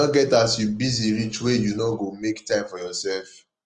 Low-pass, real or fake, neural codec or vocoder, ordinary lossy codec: 9.9 kHz; real; none; Opus, 24 kbps